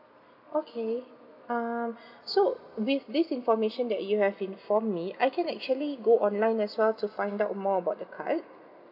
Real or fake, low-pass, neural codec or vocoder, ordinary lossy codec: real; 5.4 kHz; none; none